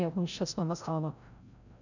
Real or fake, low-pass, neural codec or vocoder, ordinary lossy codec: fake; 7.2 kHz; codec, 16 kHz, 0.5 kbps, FreqCodec, larger model; none